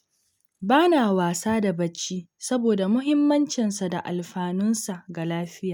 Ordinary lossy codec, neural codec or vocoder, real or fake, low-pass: none; none; real; 19.8 kHz